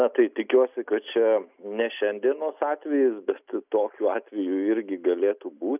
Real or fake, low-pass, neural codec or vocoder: real; 3.6 kHz; none